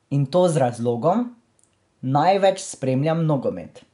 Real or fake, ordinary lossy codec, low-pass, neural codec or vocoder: real; none; 10.8 kHz; none